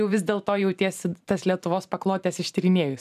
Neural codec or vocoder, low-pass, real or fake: vocoder, 44.1 kHz, 128 mel bands every 512 samples, BigVGAN v2; 14.4 kHz; fake